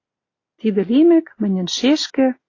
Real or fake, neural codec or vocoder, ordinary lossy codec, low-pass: real; none; AAC, 32 kbps; 7.2 kHz